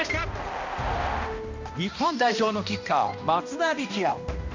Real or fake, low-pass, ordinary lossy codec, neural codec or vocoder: fake; 7.2 kHz; MP3, 48 kbps; codec, 16 kHz, 1 kbps, X-Codec, HuBERT features, trained on balanced general audio